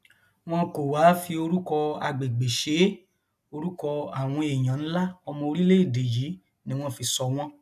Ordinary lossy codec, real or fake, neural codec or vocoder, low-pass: none; real; none; 14.4 kHz